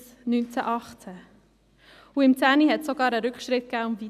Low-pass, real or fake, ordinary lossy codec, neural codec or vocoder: 14.4 kHz; real; none; none